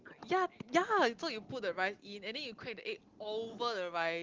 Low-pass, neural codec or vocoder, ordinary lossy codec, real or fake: 7.2 kHz; none; Opus, 16 kbps; real